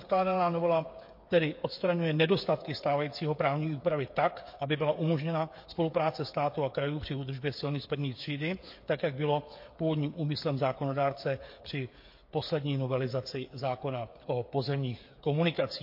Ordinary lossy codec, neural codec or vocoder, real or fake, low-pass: MP3, 32 kbps; codec, 16 kHz, 8 kbps, FreqCodec, smaller model; fake; 5.4 kHz